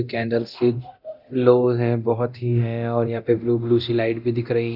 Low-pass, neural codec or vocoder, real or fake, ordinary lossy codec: 5.4 kHz; codec, 24 kHz, 0.9 kbps, DualCodec; fake; none